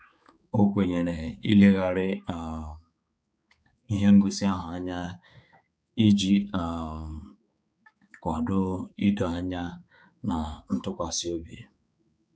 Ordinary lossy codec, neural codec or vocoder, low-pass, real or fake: none; codec, 16 kHz, 4 kbps, X-Codec, HuBERT features, trained on balanced general audio; none; fake